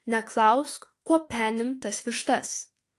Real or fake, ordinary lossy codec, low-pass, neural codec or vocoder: fake; AAC, 48 kbps; 14.4 kHz; autoencoder, 48 kHz, 32 numbers a frame, DAC-VAE, trained on Japanese speech